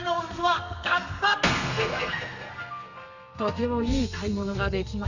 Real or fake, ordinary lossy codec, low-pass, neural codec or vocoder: fake; none; 7.2 kHz; codec, 32 kHz, 1.9 kbps, SNAC